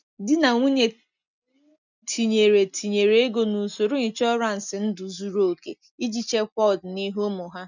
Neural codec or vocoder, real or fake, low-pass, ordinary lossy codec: none; real; 7.2 kHz; none